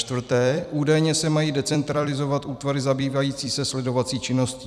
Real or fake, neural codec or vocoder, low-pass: fake; vocoder, 44.1 kHz, 128 mel bands every 256 samples, BigVGAN v2; 14.4 kHz